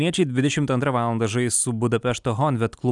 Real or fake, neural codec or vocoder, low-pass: real; none; 10.8 kHz